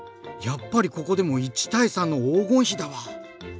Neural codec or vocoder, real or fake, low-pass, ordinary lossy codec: none; real; none; none